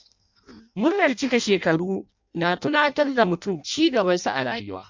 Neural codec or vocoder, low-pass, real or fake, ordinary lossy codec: codec, 16 kHz in and 24 kHz out, 0.6 kbps, FireRedTTS-2 codec; 7.2 kHz; fake; MP3, 64 kbps